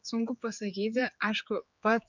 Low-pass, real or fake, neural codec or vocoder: 7.2 kHz; fake; codec, 16 kHz, 4 kbps, X-Codec, HuBERT features, trained on general audio